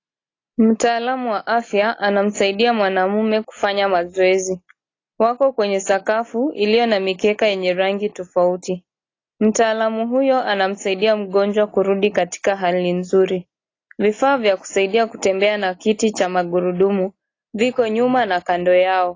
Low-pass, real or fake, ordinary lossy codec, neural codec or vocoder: 7.2 kHz; real; AAC, 32 kbps; none